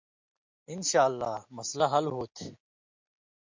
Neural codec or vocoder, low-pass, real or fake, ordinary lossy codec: none; 7.2 kHz; real; MP3, 64 kbps